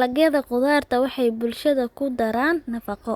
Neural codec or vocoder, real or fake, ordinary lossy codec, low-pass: none; real; Opus, 64 kbps; 19.8 kHz